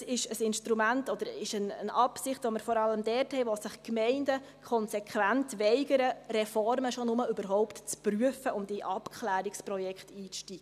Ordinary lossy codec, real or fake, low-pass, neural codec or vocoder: none; real; 14.4 kHz; none